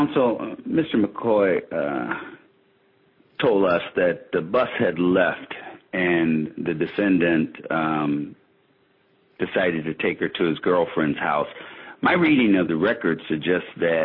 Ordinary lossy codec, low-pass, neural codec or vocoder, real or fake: MP3, 24 kbps; 5.4 kHz; none; real